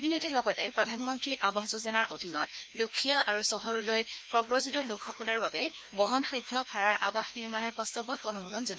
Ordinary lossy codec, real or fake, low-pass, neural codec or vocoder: none; fake; none; codec, 16 kHz, 1 kbps, FreqCodec, larger model